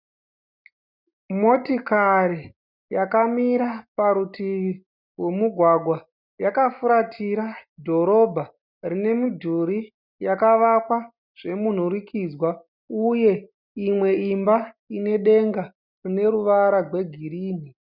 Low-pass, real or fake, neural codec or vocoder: 5.4 kHz; real; none